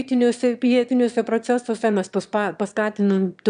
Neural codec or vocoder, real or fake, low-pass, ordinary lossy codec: autoencoder, 22.05 kHz, a latent of 192 numbers a frame, VITS, trained on one speaker; fake; 9.9 kHz; AAC, 96 kbps